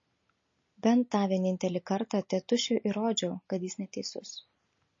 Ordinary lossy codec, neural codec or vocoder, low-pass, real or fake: MP3, 32 kbps; none; 7.2 kHz; real